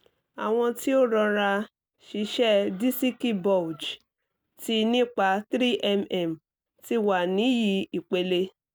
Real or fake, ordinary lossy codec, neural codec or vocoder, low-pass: real; none; none; none